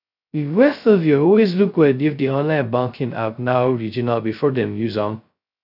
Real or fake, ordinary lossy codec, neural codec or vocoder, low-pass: fake; none; codec, 16 kHz, 0.2 kbps, FocalCodec; 5.4 kHz